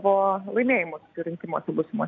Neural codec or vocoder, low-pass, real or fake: none; 7.2 kHz; real